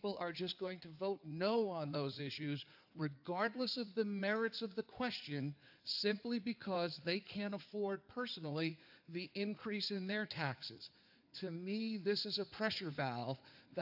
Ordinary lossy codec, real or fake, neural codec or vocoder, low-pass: AAC, 48 kbps; fake; codec, 16 kHz in and 24 kHz out, 2.2 kbps, FireRedTTS-2 codec; 5.4 kHz